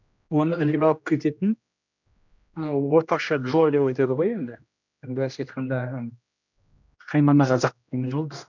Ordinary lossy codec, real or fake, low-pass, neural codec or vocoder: none; fake; 7.2 kHz; codec, 16 kHz, 1 kbps, X-Codec, HuBERT features, trained on general audio